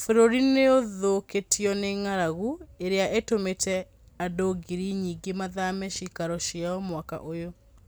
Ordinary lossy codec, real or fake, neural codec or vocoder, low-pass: none; real; none; none